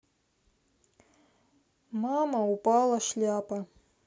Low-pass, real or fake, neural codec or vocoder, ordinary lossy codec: none; real; none; none